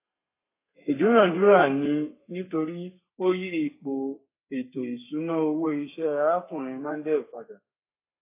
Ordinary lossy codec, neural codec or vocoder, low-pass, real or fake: AAC, 24 kbps; codec, 32 kHz, 1.9 kbps, SNAC; 3.6 kHz; fake